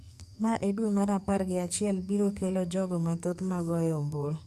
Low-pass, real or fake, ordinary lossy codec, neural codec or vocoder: 14.4 kHz; fake; none; codec, 44.1 kHz, 2.6 kbps, SNAC